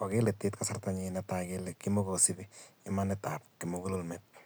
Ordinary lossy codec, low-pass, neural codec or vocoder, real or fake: none; none; none; real